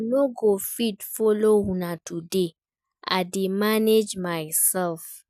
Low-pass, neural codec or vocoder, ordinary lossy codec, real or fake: 14.4 kHz; none; none; real